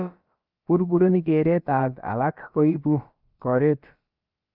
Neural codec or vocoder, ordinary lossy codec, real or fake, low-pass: codec, 16 kHz, about 1 kbps, DyCAST, with the encoder's durations; Opus, 24 kbps; fake; 5.4 kHz